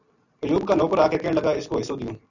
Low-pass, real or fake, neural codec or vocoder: 7.2 kHz; real; none